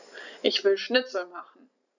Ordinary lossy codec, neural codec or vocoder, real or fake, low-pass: none; none; real; 7.2 kHz